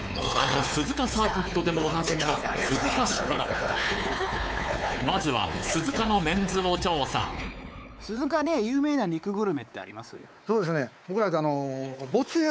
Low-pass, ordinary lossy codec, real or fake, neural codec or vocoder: none; none; fake; codec, 16 kHz, 4 kbps, X-Codec, WavLM features, trained on Multilingual LibriSpeech